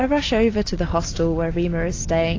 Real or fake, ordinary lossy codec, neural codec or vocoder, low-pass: real; AAC, 32 kbps; none; 7.2 kHz